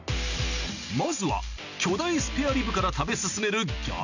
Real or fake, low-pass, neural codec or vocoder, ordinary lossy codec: real; 7.2 kHz; none; none